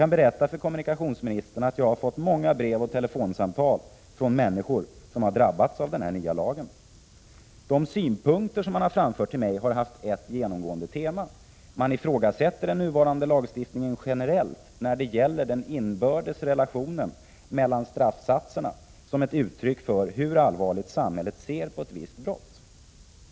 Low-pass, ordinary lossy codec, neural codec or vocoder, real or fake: none; none; none; real